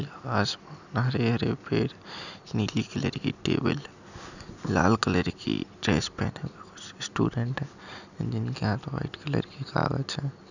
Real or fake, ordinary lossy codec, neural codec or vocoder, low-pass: real; none; none; 7.2 kHz